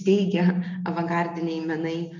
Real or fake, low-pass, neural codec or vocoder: real; 7.2 kHz; none